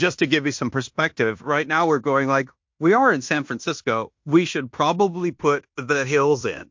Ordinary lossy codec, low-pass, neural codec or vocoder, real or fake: MP3, 48 kbps; 7.2 kHz; codec, 16 kHz in and 24 kHz out, 0.9 kbps, LongCat-Audio-Codec, fine tuned four codebook decoder; fake